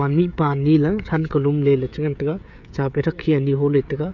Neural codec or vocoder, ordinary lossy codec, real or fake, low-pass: codec, 16 kHz, 16 kbps, FreqCodec, smaller model; none; fake; 7.2 kHz